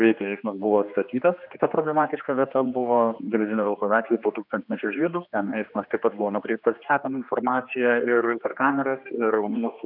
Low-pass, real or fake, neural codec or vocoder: 5.4 kHz; fake; codec, 16 kHz, 2 kbps, X-Codec, HuBERT features, trained on general audio